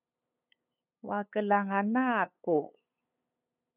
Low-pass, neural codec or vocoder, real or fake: 3.6 kHz; codec, 16 kHz, 8 kbps, FunCodec, trained on LibriTTS, 25 frames a second; fake